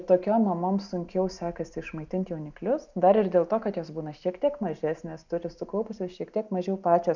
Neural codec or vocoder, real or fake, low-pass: none; real; 7.2 kHz